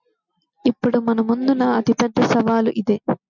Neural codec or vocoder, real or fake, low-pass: none; real; 7.2 kHz